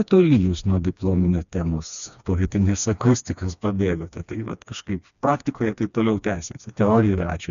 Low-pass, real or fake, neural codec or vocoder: 7.2 kHz; fake; codec, 16 kHz, 2 kbps, FreqCodec, smaller model